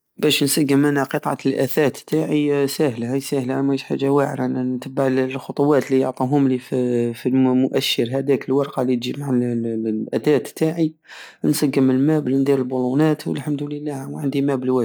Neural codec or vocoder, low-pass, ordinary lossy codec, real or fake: none; none; none; real